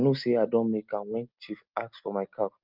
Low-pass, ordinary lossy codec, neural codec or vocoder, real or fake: 5.4 kHz; Opus, 24 kbps; none; real